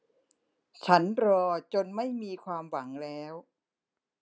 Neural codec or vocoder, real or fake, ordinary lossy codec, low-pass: none; real; none; none